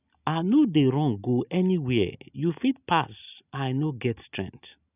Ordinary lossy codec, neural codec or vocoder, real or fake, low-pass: none; none; real; 3.6 kHz